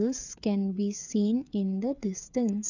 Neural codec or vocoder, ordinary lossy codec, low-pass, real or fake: codec, 16 kHz, 8 kbps, FreqCodec, smaller model; none; 7.2 kHz; fake